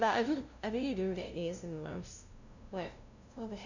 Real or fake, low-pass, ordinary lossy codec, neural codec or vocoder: fake; 7.2 kHz; none; codec, 16 kHz, 0.5 kbps, FunCodec, trained on LibriTTS, 25 frames a second